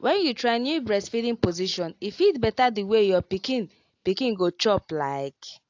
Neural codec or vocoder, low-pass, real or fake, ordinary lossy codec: none; 7.2 kHz; real; AAC, 48 kbps